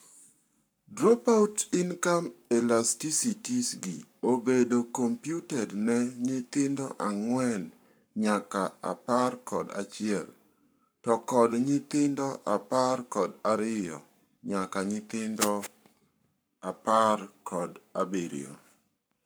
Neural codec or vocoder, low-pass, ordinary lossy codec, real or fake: codec, 44.1 kHz, 7.8 kbps, Pupu-Codec; none; none; fake